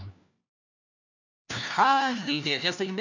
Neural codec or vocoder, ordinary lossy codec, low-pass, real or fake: codec, 16 kHz, 1 kbps, FunCodec, trained on LibriTTS, 50 frames a second; none; 7.2 kHz; fake